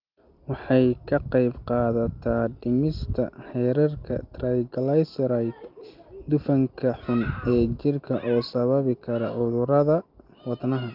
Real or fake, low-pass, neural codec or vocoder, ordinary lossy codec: real; 5.4 kHz; none; Opus, 24 kbps